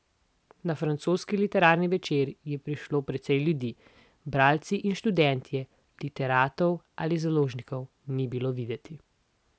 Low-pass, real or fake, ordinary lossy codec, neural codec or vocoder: none; real; none; none